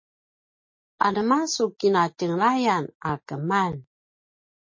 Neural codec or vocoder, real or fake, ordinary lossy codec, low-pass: none; real; MP3, 32 kbps; 7.2 kHz